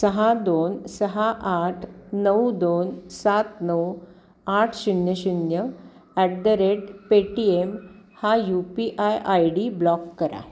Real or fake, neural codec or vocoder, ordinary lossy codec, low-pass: real; none; none; none